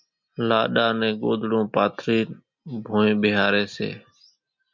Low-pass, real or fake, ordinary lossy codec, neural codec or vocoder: 7.2 kHz; real; MP3, 64 kbps; none